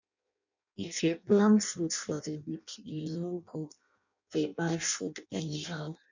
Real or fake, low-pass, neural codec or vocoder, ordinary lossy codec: fake; 7.2 kHz; codec, 16 kHz in and 24 kHz out, 0.6 kbps, FireRedTTS-2 codec; none